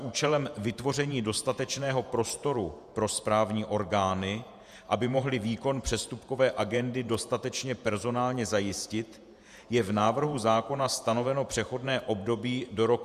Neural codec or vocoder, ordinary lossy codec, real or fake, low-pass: vocoder, 48 kHz, 128 mel bands, Vocos; Opus, 64 kbps; fake; 14.4 kHz